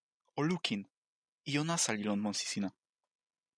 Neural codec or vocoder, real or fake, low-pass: none; real; 9.9 kHz